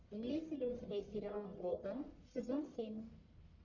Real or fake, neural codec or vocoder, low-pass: fake; codec, 44.1 kHz, 1.7 kbps, Pupu-Codec; 7.2 kHz